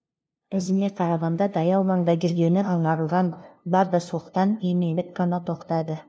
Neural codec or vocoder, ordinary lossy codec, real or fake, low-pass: codec, 16 kHz, 0.5 kbps, FunCodec, trained on LibriTTS, 25 frames a second; none; fake; none